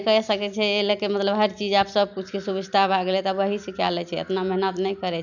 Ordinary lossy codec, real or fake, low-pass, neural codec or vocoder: none; real; 7.2 kHz; none